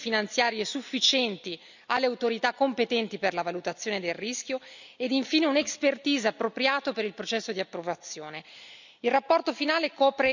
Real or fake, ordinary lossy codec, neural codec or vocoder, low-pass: real; none; none; 7.2 kHz